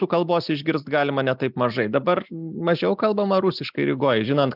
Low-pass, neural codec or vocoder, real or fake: 5.4 kHz; none; real